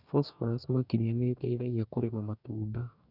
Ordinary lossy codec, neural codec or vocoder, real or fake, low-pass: none; codec, 44.1 kHz, 2.6 kbps, DAC; fake; 5.4 kHz